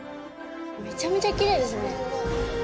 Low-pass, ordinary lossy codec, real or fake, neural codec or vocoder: none; none; real; none